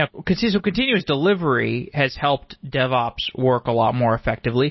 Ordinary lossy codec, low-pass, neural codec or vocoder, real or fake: MP3, 24 kbps; 7.2 kHz; none; real